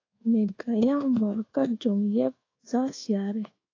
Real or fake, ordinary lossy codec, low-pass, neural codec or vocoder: fake; AAC, 32 kbps; 7.2 kHz; codec, 24 kHz, 1.2 kbps, DualCodec